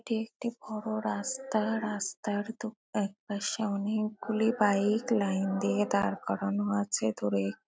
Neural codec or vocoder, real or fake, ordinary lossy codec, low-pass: none; real; none; none